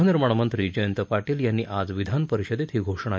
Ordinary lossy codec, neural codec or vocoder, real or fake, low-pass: none; none; real; none